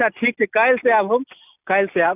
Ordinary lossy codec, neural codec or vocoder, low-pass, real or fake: none; none; 3.6 kHz; real